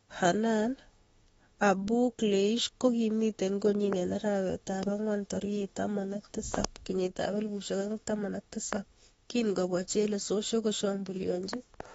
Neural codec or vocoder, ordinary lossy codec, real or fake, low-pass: autoencoder, 48 kHz, 32 numbers a frame, DAC-VAE, trained on Japanese speech; AAC, 24 kbps; fake; 19.8 kHz